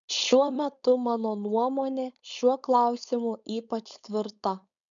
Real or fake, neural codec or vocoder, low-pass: fake; codec, 16 kHz, 4.8 kbps, FACodec; 7.2 kHz